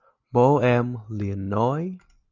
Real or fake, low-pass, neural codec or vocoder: real; 7.2 kHz; none